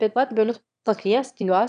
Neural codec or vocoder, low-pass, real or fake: autoencoder, 22.05 kHz, a latent of 192 numbers a frame, VITS, trained on one speaker; 9.9 kHz; fake